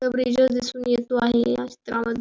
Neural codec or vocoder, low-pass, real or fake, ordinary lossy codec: none; none; real; none